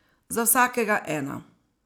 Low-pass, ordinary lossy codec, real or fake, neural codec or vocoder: none; none; real; none